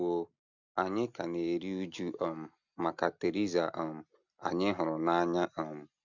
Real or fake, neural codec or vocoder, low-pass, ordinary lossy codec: real; none; 7.2 kHz; none